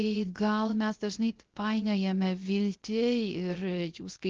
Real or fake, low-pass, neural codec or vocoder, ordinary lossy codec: fake; 7.2 kHz; codec, 16 kHz, 0.3 kbps, FocalCodec; Opus, 16 kbps